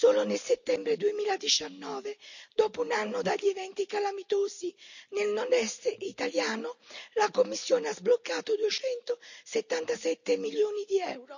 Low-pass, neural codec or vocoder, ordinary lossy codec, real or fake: 7.2 kHz; none; none; real